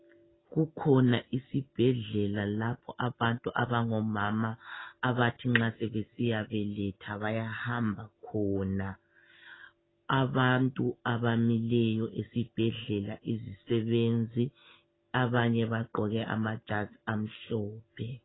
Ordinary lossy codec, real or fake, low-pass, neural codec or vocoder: AAC, 16 kbps; real; 7.2 kHz; none